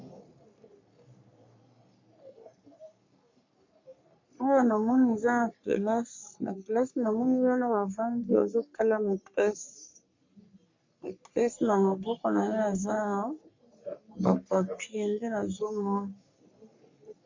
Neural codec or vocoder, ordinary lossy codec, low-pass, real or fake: codec, 44.1 kHz, 3.4 kbps, Pupu-Codec; MP3, 48 kbps; 7.2 kHz; fake